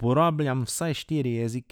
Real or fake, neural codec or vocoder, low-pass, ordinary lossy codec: real; none; 19.8 kHz; none